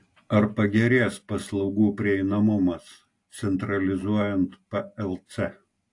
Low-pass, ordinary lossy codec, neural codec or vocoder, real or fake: 10.8 kHz; AAC, 48 kbps; none; real